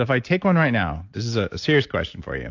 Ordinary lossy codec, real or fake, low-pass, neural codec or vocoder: AAC, 48 kbps; real; 7.2 kHz; none